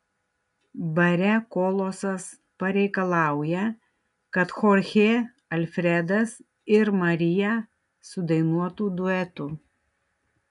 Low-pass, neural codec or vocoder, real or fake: 10.8 kHz; none; real